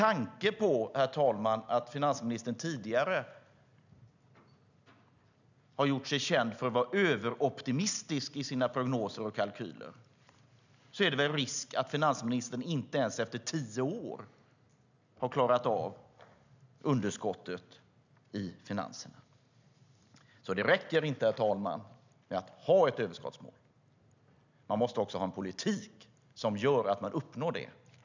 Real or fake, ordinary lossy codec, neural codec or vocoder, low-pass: real; none; none; 7.2 kHz